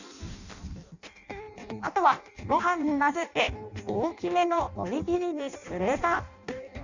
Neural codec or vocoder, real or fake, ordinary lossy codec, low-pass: codec, 16 kHz in and 24 kHz out, 0.6 kbps, FireRedTTS-2 codec; fake; none; 7.2 kHz